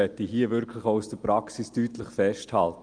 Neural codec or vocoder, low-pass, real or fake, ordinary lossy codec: none; 9.9 kHz; real; Opus, 32 kbps